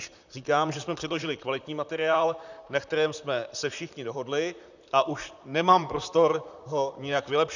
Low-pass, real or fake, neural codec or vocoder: 7.2 kHz; fake; vocoder, 44.1 kHz, 128 mel bands, Pupu-Vocoder